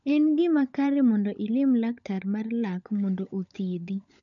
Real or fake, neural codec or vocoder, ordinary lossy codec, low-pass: fake; codec, 16 kHz, 16 kbps, FunCodec, trained on LibriTTS, 50 frames a second; none; 7.2 kHz